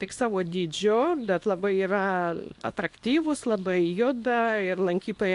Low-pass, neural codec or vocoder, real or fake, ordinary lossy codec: 10.8 kHz; codec, 24 kHz, 0.9 kbps, WavTokenizer, small release; fake; AAC, 64 kbps